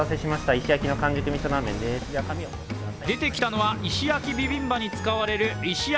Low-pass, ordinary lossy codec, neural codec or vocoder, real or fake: none; none; none; real